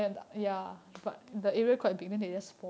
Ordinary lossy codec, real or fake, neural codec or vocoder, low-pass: none; real; none; none